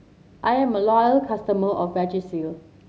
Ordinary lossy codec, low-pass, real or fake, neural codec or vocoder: none; none; real; none